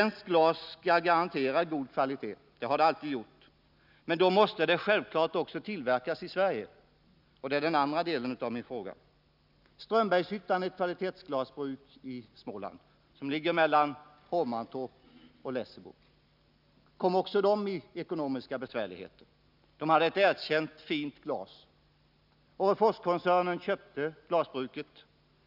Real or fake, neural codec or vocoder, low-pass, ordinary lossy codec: real; none; 5.4 kHz; none